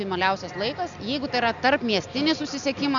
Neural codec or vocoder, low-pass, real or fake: none; 7.2 kHz; real